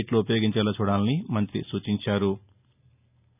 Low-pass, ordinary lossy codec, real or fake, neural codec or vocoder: 3.6 kHz; none; real; none